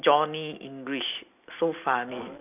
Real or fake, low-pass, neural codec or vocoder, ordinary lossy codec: real; 3.6 kHz; none; none